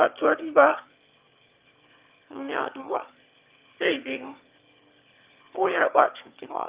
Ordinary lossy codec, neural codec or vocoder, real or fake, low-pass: Opus, 24 kbps; autoencoder, 22.05 kHz, a latent of 192 numbers a frame, VITS, trained on one speaker; fake; 3.6 kHz